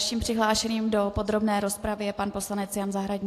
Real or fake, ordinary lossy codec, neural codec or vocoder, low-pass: real; AAC, 64 kbps; none; 14.4 kHz